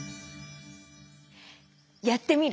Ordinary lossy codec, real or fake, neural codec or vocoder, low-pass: none; real; none; none